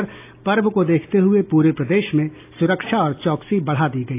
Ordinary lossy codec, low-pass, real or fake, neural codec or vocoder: AAC, 24 kbps; 3.6 kHz; real; none